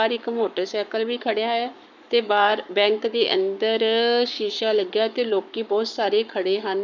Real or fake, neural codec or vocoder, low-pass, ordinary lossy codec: fake; codec, 44.1 kHz, 7.8 kbps, Pupu-Codec; 7.2 kHz; none